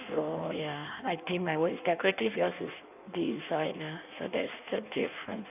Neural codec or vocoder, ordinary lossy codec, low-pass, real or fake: codec, 16 kHz in and 24 kHz out, 1.1 kbps, FireRedTTS-2 codec; none; 3.6 kHz; fake